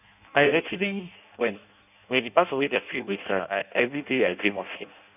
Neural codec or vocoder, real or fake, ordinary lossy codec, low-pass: codec, 16 kHz in and 24 kHz out, 0.6 kbps, FireRedTTS-2 codec; fake; none; 3.6 kHz